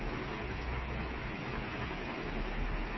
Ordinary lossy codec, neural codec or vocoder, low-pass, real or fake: MP3, 24 kbps; codec, 16 kHz, 8 kbps, FreqCodec, smaller model; 7.2 kHz; fake